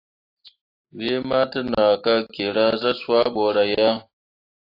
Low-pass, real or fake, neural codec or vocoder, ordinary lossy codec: 5.4 kHz; real; none; AAC, 32 kbps